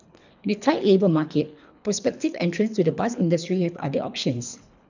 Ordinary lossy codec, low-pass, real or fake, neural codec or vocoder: none; 7.2 kHz; fake; codec, 24 kHz, 3 kbps, HILCodec